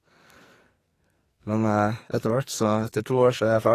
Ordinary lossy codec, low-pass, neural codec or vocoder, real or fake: AAC, 48 kbps; 14.4 kHz; codec, 44.1 kHz, 2.6 kbps, SNAC; fake